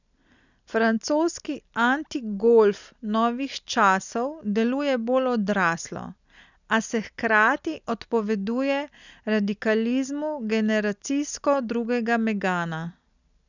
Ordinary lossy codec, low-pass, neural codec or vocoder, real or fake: none; 7.2 kHz; none; real